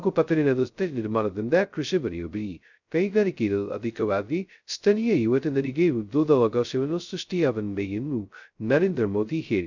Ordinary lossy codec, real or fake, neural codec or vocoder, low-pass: none; fake; codec, 16 kHz, 0.2 kbps, FocalCodec; 7.2 kHz